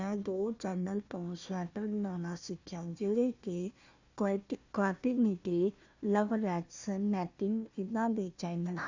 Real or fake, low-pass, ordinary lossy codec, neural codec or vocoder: fake; 7.2 kHz; Opus, 64 kbps; codec, 16 kHz, 1 kbps, FunCodec, trained on Chinese and English, 50 frames a second